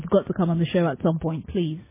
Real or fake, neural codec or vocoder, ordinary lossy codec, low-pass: real; none; MP3, 16 kbps; 3.6 kHz